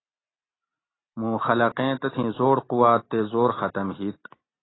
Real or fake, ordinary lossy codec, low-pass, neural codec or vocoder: real; AAC, 16 kbps; 7.2 kHz; none